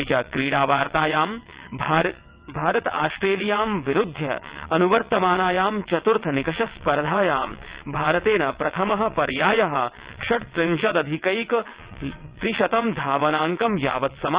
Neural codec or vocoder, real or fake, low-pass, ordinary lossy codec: vocoder, 22.05 kHz, 80 mel bands, WaveNeXt; fake; 3.6 kHz; Opus, 64 kbps